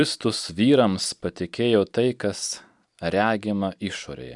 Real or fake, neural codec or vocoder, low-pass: fake; vocoder, 44.1 kHz, 128 mel bands every 256 samples, BigVGAN v2; 10.8 kHz